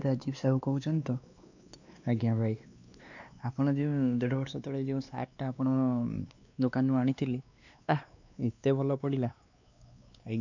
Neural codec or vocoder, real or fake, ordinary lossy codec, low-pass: codec, 16 kHz, 2 kbps, X-Codec, WavLM features, trained on Multilingual LibriSpeech; fake; none; 7.2 kHz